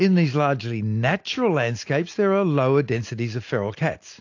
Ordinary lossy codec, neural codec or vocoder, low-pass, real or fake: AAC, 48 kbps; none; 7.2 kHz; real